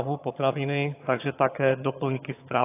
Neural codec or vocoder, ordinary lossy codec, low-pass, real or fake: vocoder, 22.05 kHz, 80 mel bands, HiFi-GAN; AAC, 24 kbps; 3.6 kHz; fake